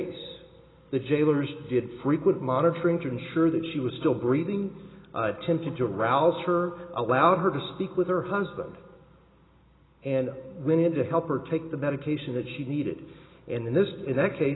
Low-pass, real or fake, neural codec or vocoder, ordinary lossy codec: 7.2 kHz; real; none; AAC, 16 kbps